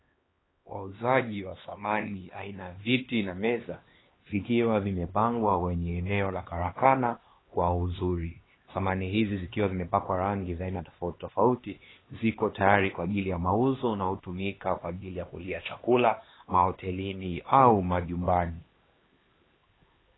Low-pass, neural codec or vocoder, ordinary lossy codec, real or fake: 7.2 kHz; codec, 16 kHz, 2 kbps, X-Codec, HuBERT features, trained on LibriSpeech; AAC, 16 kbps; fake